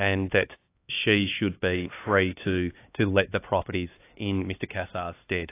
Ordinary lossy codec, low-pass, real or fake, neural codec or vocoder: AAC, 24 kbps; 3.6 kHz; fake; codec, 16 kHz, 2 kbps, X-Codec, HuBERT features, trained on LibriSpeech